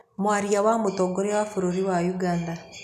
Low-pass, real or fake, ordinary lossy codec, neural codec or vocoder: 14.4 kHz; real; none; none